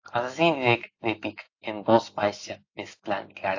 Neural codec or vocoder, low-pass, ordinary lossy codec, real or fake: none; 7.2 kHz; AAC, 48 kbps; real